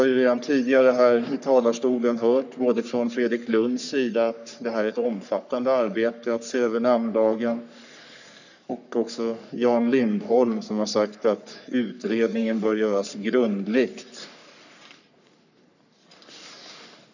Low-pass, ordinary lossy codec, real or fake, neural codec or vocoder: 7.2 kHz; none; fake; codec, 44.1 kHz, 3.4 kbps, Pupu-Codec